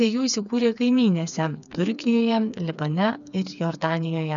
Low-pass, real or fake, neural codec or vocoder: 7.2 kHz; fake; codec, 16 kHz, 4 kbps, FreqCodec, smaller model